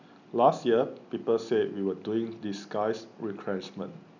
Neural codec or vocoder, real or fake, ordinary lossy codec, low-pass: none; real; none; 7.2 kHz